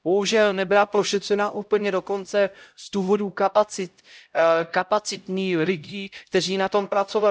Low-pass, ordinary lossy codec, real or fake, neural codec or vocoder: none; none; fake; codec, 16 kHz, 0.5 kbps, X-Codec, HuBERT features, trained on LibriSpeech